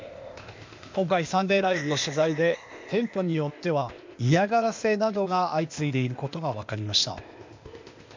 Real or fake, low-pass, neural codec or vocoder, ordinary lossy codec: fake; 7.2 kHz; codec, 16 kHz, 0.8 kbps, ZipCodec; MP3, 64 kbps